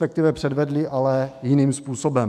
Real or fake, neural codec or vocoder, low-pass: fake; autoencoder, 48 kHz, 128 numbers a frame, DAC-VAE, trained on Japanese speech; 14.4 kHz